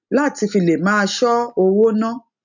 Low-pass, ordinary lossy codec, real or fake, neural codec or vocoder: 7.2 kHz; none; real; none